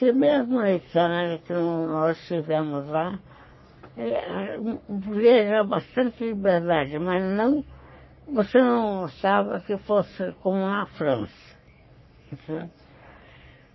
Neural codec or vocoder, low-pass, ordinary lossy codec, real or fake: codec, 44.1 kHz, 3.4 kbps, Pupu-Codec; 7.2 kHz; MP3, 24 kbps; fake